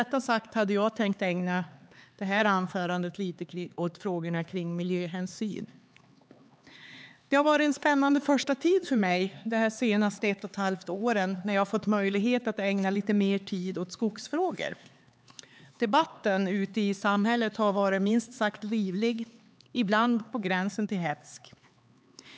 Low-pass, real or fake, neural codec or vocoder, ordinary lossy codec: none; fake; codec, 16 kHz, 4 kbps, X-Codec, HuBERT features, trained on LibriSpeech; none